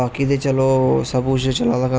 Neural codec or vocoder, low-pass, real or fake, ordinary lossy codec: none; none; real; none